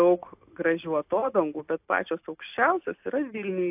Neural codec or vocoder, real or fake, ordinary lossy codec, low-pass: none; real; AAC, 32 kbps; 3.6 kHz